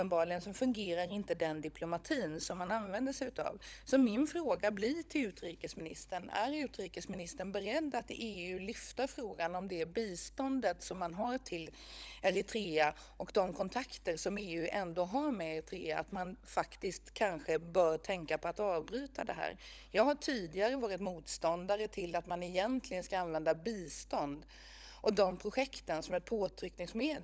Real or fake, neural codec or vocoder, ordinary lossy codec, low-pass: fake; codec, 16 kHz, 16 kbps, FunCodec, trained on LibriTTS, 50 frames a second; none; none